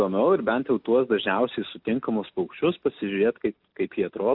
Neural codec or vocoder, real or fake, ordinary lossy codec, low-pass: none; real; AAC, 48 kbps; 5.4 kHz